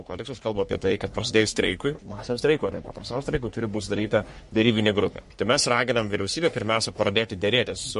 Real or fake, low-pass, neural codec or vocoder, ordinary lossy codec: fake; 14.4 kHz; codec, 44.1 kHz, 3.4 kbps, Pupu-Codec; MP3, 48 kbps